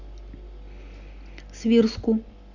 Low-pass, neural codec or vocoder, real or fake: 7.2 kHz; none; real